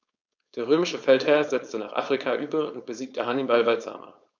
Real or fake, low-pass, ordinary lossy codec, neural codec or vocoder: fake; 7.2 kHz; none; codec, 16 kHz, 4.8 kbps, FACodec